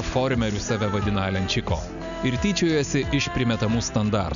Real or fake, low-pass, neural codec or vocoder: real; 7.2 kHz; none